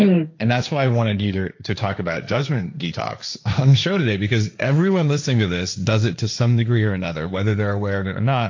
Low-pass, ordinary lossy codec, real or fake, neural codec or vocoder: 7.2 kHz; AAC, 48 kbps; fake; codec, 16 kHz, 1.1 kbps, Voila-Tokenizer